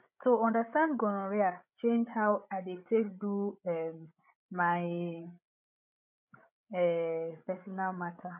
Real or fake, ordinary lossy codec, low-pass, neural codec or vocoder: fake; none; 3.6 kHz; codec, 16 kHz, 8 kbps, FreqCodec, larger model